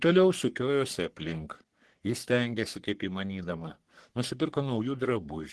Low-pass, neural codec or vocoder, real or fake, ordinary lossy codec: 10.8 kHz; codec, 44.1 kHz, 3.4 kbps, Pupu-Codec; fake; Opus, 16 kbps